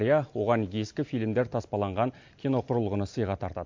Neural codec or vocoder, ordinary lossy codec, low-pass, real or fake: none; MP3, 48 kbps; 7.2 kHz; real